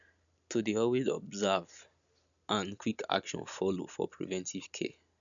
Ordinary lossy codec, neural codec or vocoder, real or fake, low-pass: none; none; real; 7.2 kHz